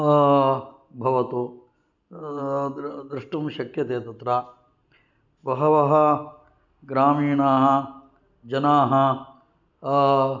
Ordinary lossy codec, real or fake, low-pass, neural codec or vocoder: none; real; 7.2 kHz; none